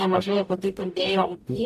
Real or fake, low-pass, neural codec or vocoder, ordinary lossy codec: fake; 14.4 kHz; codec, 44.1 kHz, 0.9 kbps, DAC; AAC, 96 kbps